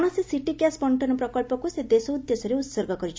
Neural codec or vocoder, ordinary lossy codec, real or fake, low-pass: none; none; real; none